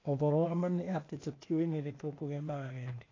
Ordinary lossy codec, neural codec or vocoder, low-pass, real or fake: AAC, 32 kbps; codec, 16 kHz, 0.8 kbps, ZipCodec; 7.2 kHz; fake